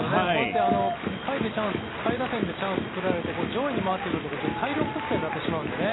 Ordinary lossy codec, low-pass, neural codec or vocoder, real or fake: AAC, 16 kbps; 7.2 kHz; none; real